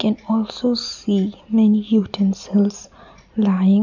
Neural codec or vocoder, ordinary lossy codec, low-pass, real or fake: none; none; 7.2 kHz; real